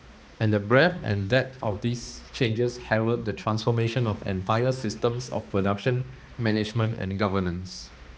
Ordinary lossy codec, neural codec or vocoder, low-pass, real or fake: none; codec, 16 kHz, 2 kbps, X-Codec, HuBERT features, trained on balanced general audio; none; fake